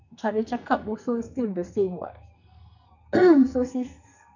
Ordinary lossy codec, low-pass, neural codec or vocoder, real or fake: none; 7.2 kHz; codec, 44.1 kHz, 2.6 kbps, SNAC; fake